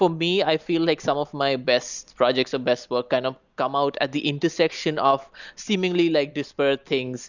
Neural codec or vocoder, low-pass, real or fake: none; 7.2 kHz; real